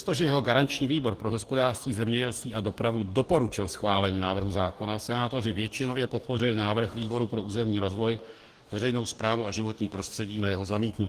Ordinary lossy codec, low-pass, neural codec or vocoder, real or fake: Opus, 24 kbps; 14.4 kHz; codec, 44.1 kHz, 2.6 kbps, DAC; fake